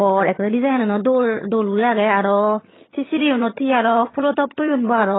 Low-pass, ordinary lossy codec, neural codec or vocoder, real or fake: 7.2 kHz; AAC, 16 kbps; vocoder, 22.05 kHz, 80 mel bands, HiFi-GAN; fake